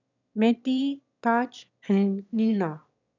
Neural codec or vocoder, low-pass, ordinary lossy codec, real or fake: autoencoder, 22.05 kHz, a latent of 192 numbers a frame, VITS, trained on one speaker; 7.2 kHz; none; fake